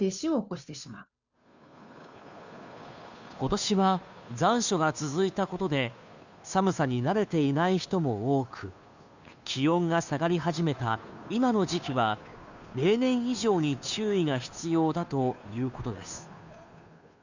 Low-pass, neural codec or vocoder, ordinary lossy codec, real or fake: 7.2 kHz; codec, 16 kHz, 2 kbps, FunCodec, trained on Chinese and English, 25 frames a second; none; fake